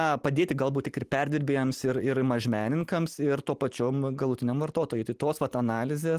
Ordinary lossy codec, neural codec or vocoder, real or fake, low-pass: Opus, 24 kbps; none; real; 14.4 kHz